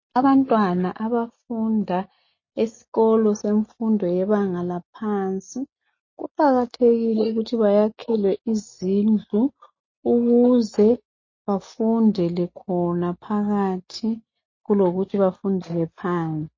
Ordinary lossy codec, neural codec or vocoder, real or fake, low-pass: MP3, 32 kbps; none; real; 7.2 kHz